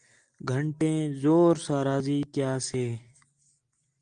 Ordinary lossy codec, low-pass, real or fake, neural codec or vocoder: Opus, 32 kbps; 9.9 kHz; real; none